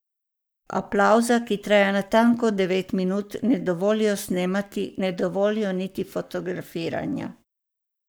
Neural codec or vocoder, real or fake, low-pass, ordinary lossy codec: codec, 44.1 kHz, 7.8 kbps, Pupu-Codec; fake; none; none